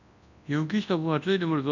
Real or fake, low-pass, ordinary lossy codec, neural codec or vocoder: fake; 7.2 kHz; none; codec, 24 kHz, 0.9 kbps, WavTokenizer, large speech release